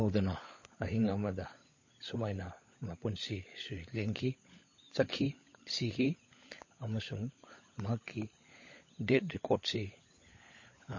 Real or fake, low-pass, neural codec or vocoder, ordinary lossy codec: fake; 7.2 kHz; codec, 16 kHz, 16 kbps, FunCodec, trained on LibriTTS, 50 frames a second; MP3, 32 kbps